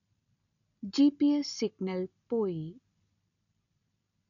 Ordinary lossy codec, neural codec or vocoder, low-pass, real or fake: none; none; 7.2 kHz; real